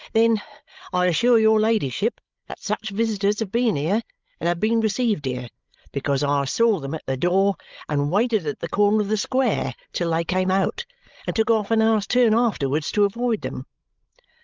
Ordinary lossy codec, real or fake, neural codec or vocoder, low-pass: Opus, 24 kbps; fake; codec, 16 kHz, 16 kbps, FreqCodec, larger model; 7.2 kHz